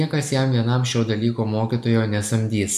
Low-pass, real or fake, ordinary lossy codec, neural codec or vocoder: 14.4 kHz; real; MP3, 96 kbps; none